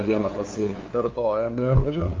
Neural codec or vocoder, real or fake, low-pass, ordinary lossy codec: codec, 16 kHz, 2 kbps, FunCodec, trained on LibriTTS, 25 frames a second; fake; 7.2 kHz; Opus, 24 kbps